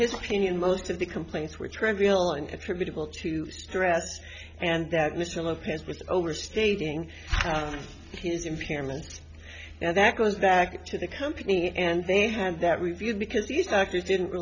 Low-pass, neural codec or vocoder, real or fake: 7.2 kHz; none; real